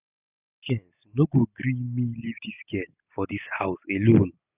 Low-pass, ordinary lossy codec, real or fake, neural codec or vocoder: 3.6 kHz; none; real; none